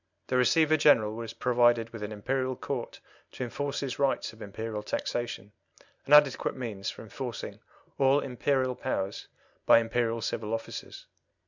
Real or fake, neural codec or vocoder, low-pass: real; none; 7.2 kHz